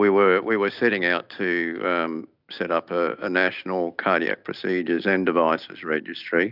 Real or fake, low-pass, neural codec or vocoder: real; 5.4 kHz; none